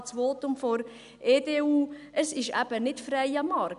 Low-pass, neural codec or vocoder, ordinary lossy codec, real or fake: 10.8 kHz; none; none; real